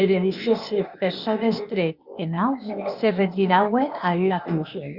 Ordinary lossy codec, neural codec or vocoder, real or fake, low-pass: Opus, 64 kbps; codec, 16 kHz, 0.8 kbps, ZipCodec; fake; 5.4 kHz